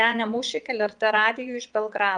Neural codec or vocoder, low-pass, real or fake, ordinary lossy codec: vocoder, 22.05 kHz, 80 mel bands, Vocos; 9.9 kHz; fake; Opus, 32 kbps